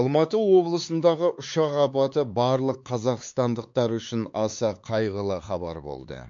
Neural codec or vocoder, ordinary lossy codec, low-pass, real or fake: codec, 16 kHz, 4 kbps, X-Codec, HuBERT features, trained on LibriSpeech; MP3, 48 kbps; 7.2 kHz; fake